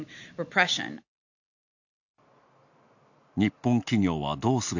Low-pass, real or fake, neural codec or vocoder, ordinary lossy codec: 7.2 kHz; real; none; none